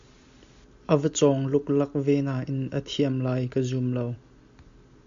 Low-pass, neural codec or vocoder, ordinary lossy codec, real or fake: 7.2 kHz; none; MP3, 64 kbps; real